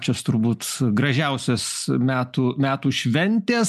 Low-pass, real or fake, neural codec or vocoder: 14.4 kHz; real; none